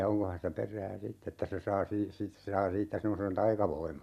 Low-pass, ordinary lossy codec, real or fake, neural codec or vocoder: 14.4 kHz; none; real; none